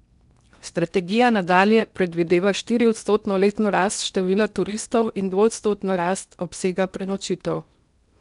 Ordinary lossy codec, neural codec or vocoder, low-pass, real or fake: none; codec, 16 kHz in and 24 kHz out, 0.8 kbps, FocalCodec, streaming, 65536 codes; 10.8 kHz; fake